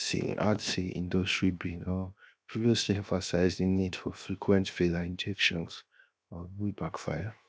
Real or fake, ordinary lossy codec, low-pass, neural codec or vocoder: fake; none; none; codec, 16 kHz, 0.7 kbps, FocalCodec